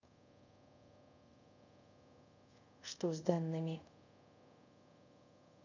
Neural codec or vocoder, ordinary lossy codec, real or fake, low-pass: codec, 24 kHz, 0.5 kbps, DualCodec; none; fake; 7.2 kHz